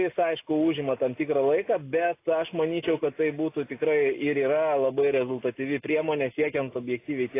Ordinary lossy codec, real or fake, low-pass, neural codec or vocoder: AAC, 24 kbps; real; 3.6 kHz; none